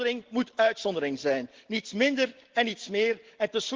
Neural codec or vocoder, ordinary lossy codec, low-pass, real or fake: none; Opus, 16 kbps; 7.2 kHz; real